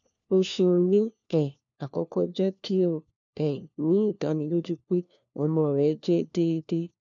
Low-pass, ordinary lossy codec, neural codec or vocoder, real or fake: 7.2 kHz; none; codec, 16 kHz, 1 kbps, FunCodec, trained on LibriTTS, 50 frames a second; fake